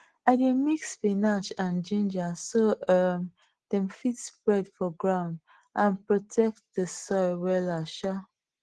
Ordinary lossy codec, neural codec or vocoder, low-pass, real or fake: Opus, 16 kbps; none; 10.8 kHz; real